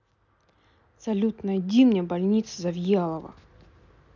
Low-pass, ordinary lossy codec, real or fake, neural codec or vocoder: 7.2 kHz; none; real; none